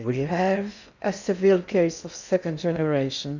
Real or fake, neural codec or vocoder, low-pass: fake; codec, 16 kHz in and 24 kHz out, 0.6 kbps, FocalCodec, streaming, 4096 codes; 7.2 kHz